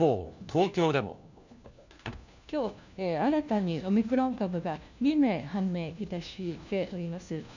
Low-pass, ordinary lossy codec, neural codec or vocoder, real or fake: 7.2 kHz; none; codec, 16 kHz, 1 kbps, FunCodec, trained on LibriTTS, 50 frames a second; fake